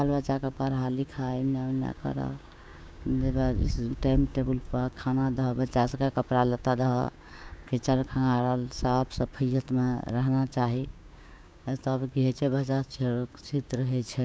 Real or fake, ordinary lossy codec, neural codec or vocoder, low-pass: fake; none; codec, 16 kHz, 6 kbps, DAC; none